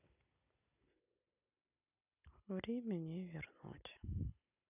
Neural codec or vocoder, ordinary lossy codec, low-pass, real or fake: none; none; 3.6 kHz; real